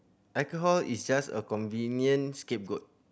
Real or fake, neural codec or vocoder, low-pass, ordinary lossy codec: real; none; none; none